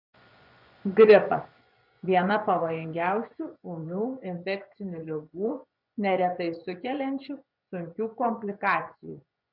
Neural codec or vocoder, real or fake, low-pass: vocoder, 24 kHz, 100 mel bands, Vocos; fake; 5.4 kHz